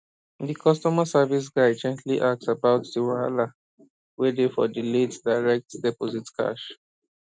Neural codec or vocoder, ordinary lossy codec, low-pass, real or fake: none; none; none; real